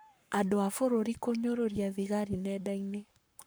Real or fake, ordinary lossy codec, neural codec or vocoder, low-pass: fake; none; codec, 44.1 kHz, 7.8 kbps, Pupu-Codec; none